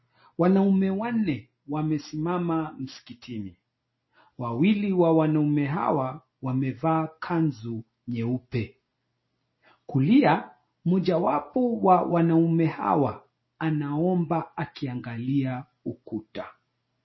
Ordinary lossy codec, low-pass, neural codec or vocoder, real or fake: MP3, 24 kbps; 7.2 kHz; none; real